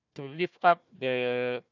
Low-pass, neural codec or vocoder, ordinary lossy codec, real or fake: 7.2 kHz; codec, 16 kHz, 1 kbps, FunCodec, trained on Chinese and English, 50 frames a second; none; fake